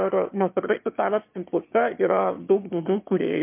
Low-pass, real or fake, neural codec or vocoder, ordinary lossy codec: 3.6 kHz; fake; autoencoder, 22.05 kHz, a latent of 192 numbers a frame, VITS, trained on one speaker; MP3, 32 kbps